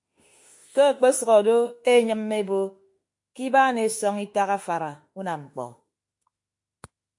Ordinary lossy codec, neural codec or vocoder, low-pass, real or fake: MP3, 48 kbps; autoencoder, 48 kHz, 32 numbers a frame, DAC-VAE, trained on Japanese speech; 10.8 kHz; fake